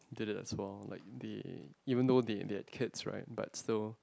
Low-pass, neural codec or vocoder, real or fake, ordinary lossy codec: none; none; real; none